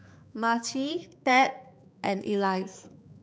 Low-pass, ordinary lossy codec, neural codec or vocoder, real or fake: none; none; codec, 16 kHz, 4 kbps, X-Codec, HuBERT features, trained on balanced general audio; fake